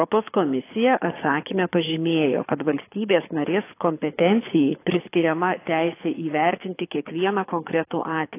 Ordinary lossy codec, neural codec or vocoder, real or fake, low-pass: AAC, 24 kbps; codec, 24 kHz, 6 kbps, HILCodec; fake; 3.6 kHz